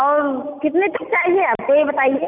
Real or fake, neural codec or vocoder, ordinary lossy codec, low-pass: real; none; none; 3.6 kHz